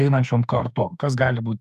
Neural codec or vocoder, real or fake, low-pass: autoencoder, 48 kHz, 32 numbers a frame, DAC-VAE, trained on Japanese speech; fake; 14.4 kHz